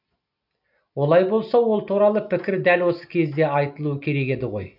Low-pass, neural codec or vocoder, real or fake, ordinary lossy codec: 5.4 kHz; none; real; none